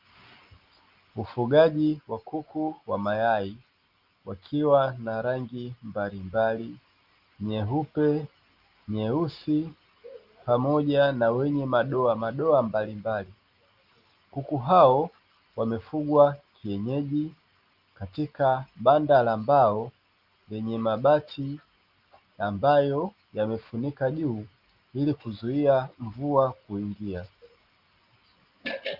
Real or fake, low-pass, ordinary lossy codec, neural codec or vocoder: real; 5.4 kHz; Opus, 32 kbps; none